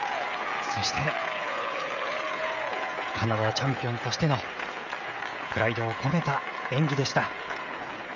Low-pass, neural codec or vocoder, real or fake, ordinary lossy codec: 7.2 kHz; codec, 16 kHz, 16 kbps, FreqCodec, smaller model; fake; none